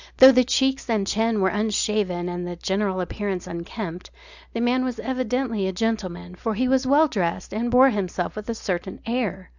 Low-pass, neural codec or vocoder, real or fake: 7.2 kHz; none; real